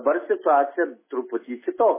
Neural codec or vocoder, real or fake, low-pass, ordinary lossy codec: none; real; 3.6 kHz; MP3, 16 kbps